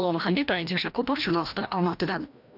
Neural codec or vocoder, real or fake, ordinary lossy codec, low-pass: codec, 16 kHz, 1 kbps, FreqCodec, larger model; fake; none; 5.4 kHz